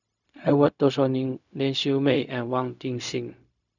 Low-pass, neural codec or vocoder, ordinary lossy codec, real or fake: 7.2 kHz; codec, 16 kHz, 0.4 kbps, LongCat-Audio-Codec; none; fake